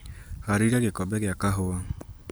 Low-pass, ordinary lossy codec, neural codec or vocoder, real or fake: none; none; none; real